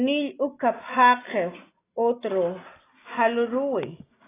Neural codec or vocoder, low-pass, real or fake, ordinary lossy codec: none; 3.6 kHz; real; AAC, 16 kbps